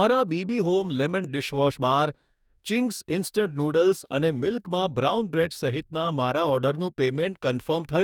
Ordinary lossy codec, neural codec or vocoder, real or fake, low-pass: none; codec, 44.1 kHz, 2.6 kbps, DAC; fake; 19.8 kHz